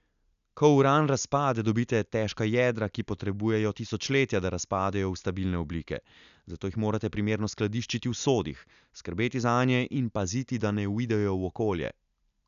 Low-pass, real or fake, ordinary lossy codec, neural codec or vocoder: 7.2 kHz; real; none; none